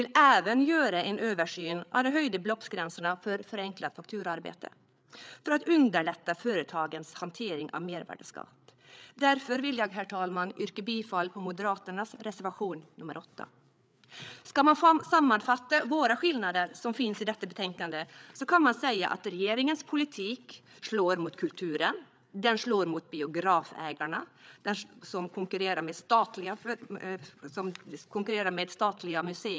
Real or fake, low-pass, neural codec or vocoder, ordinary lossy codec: fake; none; codec, 16 kHz, 8 kbps, FreqCodec, larger model; none